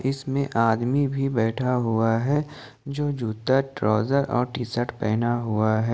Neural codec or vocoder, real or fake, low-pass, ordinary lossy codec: none; real; none; none